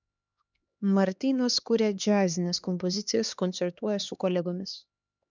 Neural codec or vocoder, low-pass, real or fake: codec, 16 kHz, 2 kbps, X-Codec, HuBERT features, trained on LibriSpeech; 7.2 kHz; fake